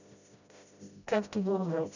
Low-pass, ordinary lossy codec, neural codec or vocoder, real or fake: 7.2 kHz; none; codec, 16 kHz, 0.5 kbps, FreqCodec, smaller model; fake